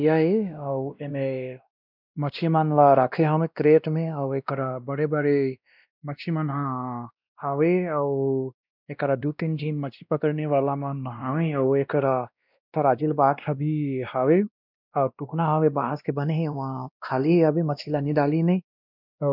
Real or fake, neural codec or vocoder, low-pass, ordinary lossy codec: fake; codec, 16 kHz, 1 kbps, X-Codec, WavLM features, trained on Multilingual LibriSpeech; 5.4 kHz; none